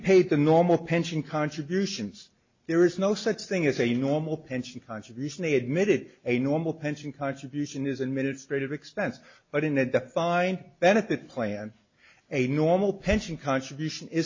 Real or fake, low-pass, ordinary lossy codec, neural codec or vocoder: real; 7.2 kHz; MP3, 32 kbps; none